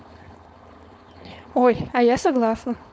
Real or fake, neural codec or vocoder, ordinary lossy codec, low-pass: fake; codec, 16 kHz, 4.8 kbps, FACodec; none; none